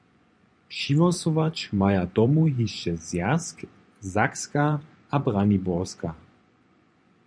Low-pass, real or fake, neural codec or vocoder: 9.9 kHz; fake; vocoder, 24 kHz, 100 mel bands, Vocos